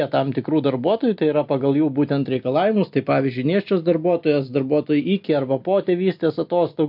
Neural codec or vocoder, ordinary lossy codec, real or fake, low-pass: none; MP3, 48 kbps; real; 5.4 kHz